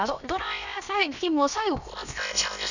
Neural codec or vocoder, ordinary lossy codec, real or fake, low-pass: codec, 16 kHz, about 1 kbps, DyCAST, with the encoder's durations; none; fake; 7.2 kHz